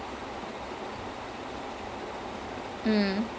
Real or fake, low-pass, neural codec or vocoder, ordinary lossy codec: real; none; none; none